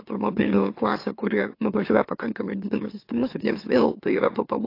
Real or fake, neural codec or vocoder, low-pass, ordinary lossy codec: fake; autoencoder, 44.1 kHz, a latent of 192 numbers a frame, MeloTTS; 5.4 kHz; AAC, 32 kbps